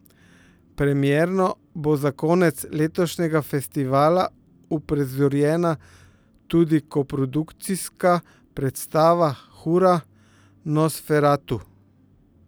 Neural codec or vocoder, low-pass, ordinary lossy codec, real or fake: none; none; none; real